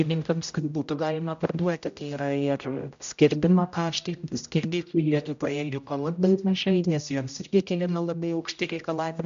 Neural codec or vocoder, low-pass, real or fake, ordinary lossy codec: codec, 16 kHz, 0.5 kbps, X-Codec, HuBERT features, trained on general audio; 7.2 kHz; fake; MP3, 64 kbps